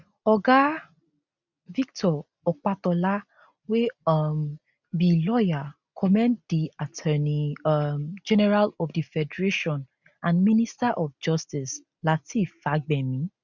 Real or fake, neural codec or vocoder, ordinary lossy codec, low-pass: real; none; none; 7.2 kHz